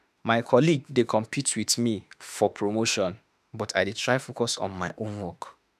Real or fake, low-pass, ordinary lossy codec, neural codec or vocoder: fake; 14.4 kHz; none; autoencoder, 48 kHz, 32 numbers a frame, DAC-VAE, trained on Japanese speech